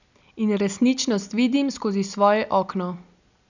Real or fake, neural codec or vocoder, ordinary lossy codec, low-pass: real; none; none; 7.2 kHz